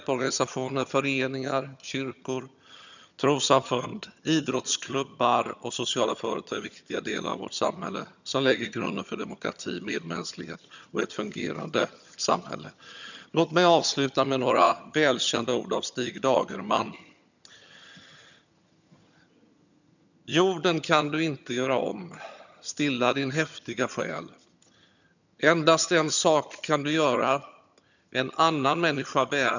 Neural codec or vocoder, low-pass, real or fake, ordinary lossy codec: vocoder, 22.05 kHz, 80 mel bands, HiFi-GAN; 7.2 kHz; fake; none